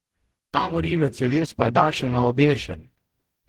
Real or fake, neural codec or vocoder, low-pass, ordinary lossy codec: fake; codec, 44.1 kHz, 0.9 kbps, DAC; 19.8 kHz; Opus, 16 kbps